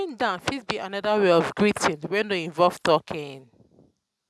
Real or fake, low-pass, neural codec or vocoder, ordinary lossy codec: real; none; none; none